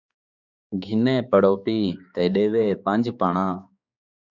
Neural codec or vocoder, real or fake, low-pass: codec, 16 kHz, 4 kbps, X-Codec, HuBERT features, trained on balanced general audio; fake; 7.2 kHz